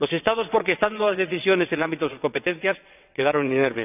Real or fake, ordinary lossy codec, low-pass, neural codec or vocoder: fake; none; 3.6 kHz; vocoder, 22.05 kHz, 80 mel bands, WaveNeXt